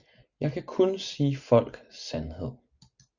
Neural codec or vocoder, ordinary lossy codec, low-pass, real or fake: none; Opus, 64 kbps; 7.2 kHz; real